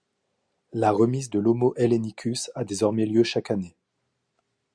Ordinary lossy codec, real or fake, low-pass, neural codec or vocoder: Opus, 64 kbps; real; 9.9 kHz; none